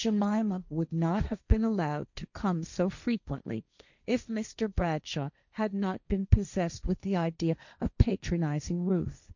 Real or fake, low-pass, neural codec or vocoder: fake; 7.2 kHz; codec, 16 kHz, 1.1 kbps, Voila-Tokenizer